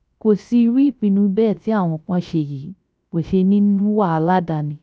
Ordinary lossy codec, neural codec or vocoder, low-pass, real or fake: none; codec, 16 kHz, 0.3 kbps, FocalCodec; none; fake